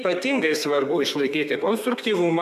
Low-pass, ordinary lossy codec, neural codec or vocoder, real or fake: 14.4 kHz; MP3, 96 kbps; codec, 32 kHz, 1.9 kbps, SNAC; fake